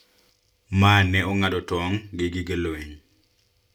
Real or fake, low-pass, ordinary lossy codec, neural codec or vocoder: real; 19.8 kHz; none; none